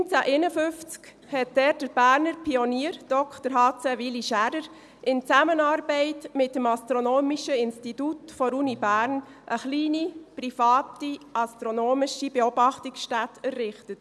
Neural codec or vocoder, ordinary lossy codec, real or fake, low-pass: none; none; real; none